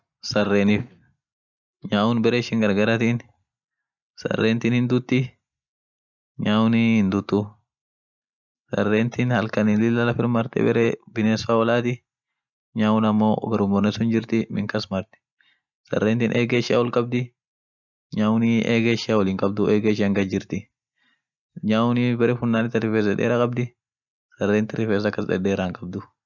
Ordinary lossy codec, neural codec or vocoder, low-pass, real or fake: none; none; 7.2 kHz; real